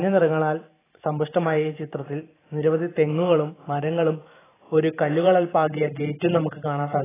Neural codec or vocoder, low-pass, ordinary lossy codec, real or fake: vocoder, 44.1 kHz, 128 mel bands every 512 samples, BigVGAN v2; 3.6 kHz; AAC, 16 kbps; fake